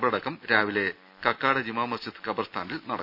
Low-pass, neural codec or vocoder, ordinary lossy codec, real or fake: 5.4 kHz; none; none; real